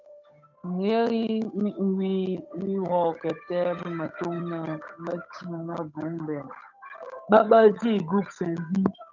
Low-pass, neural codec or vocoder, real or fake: 7.2 kHz; codec, 16 kHz, 8 kbps, FunCodec, trained on Chinese and English, 25 frames a second; fake